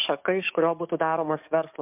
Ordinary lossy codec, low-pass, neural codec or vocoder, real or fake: MP3, 32 kbps; 3.6 kHz; codec, 44.1 kHz, 7.8 kbps, DAC; fake